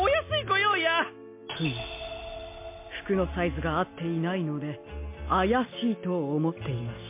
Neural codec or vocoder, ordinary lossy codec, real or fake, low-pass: none; MP3, 24 kbps; real; 3.6 kHz